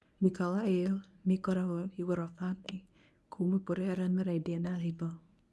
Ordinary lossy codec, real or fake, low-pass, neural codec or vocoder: none; fake; none; codec, 24 kHz, 0.9 kbps, WavTokenizer, medium speech release version 1